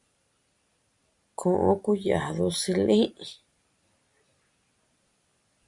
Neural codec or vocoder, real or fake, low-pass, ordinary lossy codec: none; real; 10.8 kHz; Opus, 64 kbps